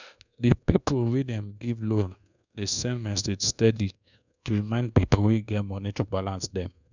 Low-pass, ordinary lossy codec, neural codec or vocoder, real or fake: 7.2 kHz; none; codec, 24 kHz, 1.2 kbps, DualCodec; fake